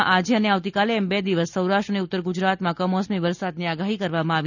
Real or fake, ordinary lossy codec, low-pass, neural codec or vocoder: real; none; 7.2 kHz; none